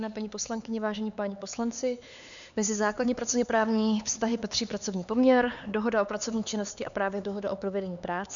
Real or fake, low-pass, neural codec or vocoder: fake; 7.2 kHz; codec, 16 kHz, 4 kbps, X-Codec, HuBERT features, trained on LibriSpeech